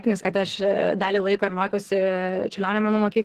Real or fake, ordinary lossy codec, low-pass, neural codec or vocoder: fake; Opus, 16 kbps; 14.4 kHz; codec, 44.1 kHz, 2.6 kbps, SNAC